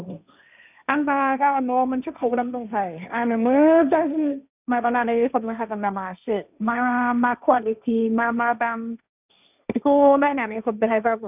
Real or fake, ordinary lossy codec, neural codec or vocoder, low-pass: fake; none; codec, 16 kHz, 1.1 kbps, Voila-Tokenizer; 3.6 kHz